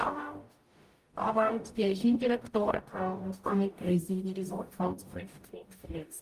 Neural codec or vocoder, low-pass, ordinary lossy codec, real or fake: codec, 44.1 kHz, 0.9 kbps, DAC; 14.4 kHz; Opus, 32 kbps; fake